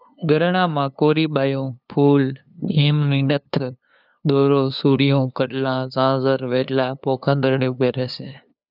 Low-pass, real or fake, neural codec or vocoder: 5.4 kHz; fake; codec, 16 kHz, 2 kbps, FunCodec, trained on LibriTTS, 25 frames a second